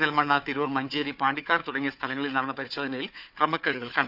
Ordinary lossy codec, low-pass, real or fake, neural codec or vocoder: none; 5.4 kHz; fake; codec, 16 kHz, 4 kbps, FunCodec, trained on Chinese and English, 50 frames a second